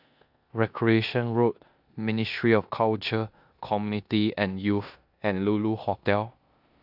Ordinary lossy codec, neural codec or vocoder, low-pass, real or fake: AAC, 48 kbps; codec, 16 kHz in and 24 kHz out, 0.9 kbps, LongCat-Audio-Codec, four codebook decoder; 5.4 kHz; fake